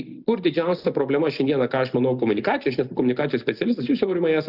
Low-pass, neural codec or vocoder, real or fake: 5.4 kHz; none; real